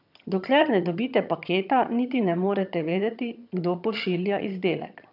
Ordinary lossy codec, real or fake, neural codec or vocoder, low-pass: none; fake; vocoder, 22.05 kHz, 80 mel bands, HiFi-GAN; 5.4 kHz